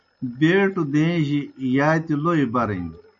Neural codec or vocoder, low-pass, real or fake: none; 7.2 kHz; real